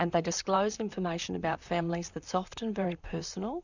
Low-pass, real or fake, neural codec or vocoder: 7.2 kHz; fake; vocoder, 44.1 kHz, 128 mel bands, Pupu-Vocoder